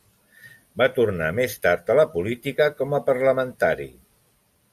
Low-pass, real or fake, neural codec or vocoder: 14.4 kHz; real; none